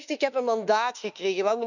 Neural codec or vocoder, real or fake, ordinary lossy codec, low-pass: autoencoder, 48 kHz, 32 numbers a frame, DAC-VAE, trained on Japanese speech; fake; none; 7.2 kHz